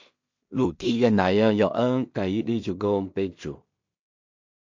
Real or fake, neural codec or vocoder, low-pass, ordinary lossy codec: fake; codec, 16 kHz in and 24 kHz out, 0.4 kbps, LongCat-Audio-Codec, two codebook decoder; 7.2 kHz; MP3, 48 kbps